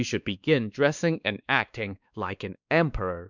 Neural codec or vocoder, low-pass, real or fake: codec, 16 kHz, 2 kbps, X-Codec, WavLM features, trained on Multilingual LibriSpeech; 7.2 kHz; fake